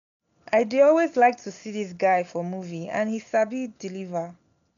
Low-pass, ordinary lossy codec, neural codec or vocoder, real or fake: 7.2 kHz; none; none; real